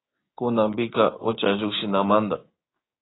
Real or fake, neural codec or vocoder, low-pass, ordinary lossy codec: fake; codec, 16 kHz, 6 kbps, DAC; 7.2 kHz; AAC, 16 kbps